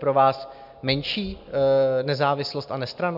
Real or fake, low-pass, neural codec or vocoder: real; 5.4 kHz; none